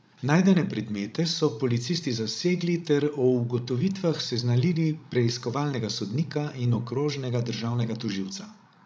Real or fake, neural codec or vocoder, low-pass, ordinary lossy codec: fake; codec, 16 kHz, 16 kbps, FreqCodec, larger model; none; none